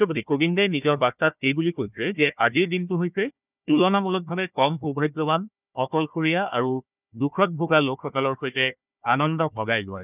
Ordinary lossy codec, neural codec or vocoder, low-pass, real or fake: none; codec, 16 kHz, 1 kbps, FunCodec, trained on Chinese and English, 50 frames a second; 3.6 kHz; fake